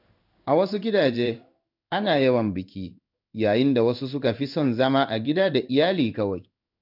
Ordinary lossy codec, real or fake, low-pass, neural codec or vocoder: none; fake; 5.4 kHz; codec, 16 kHz in and 24 kHz out, 1 kbps, XY-Tokenizer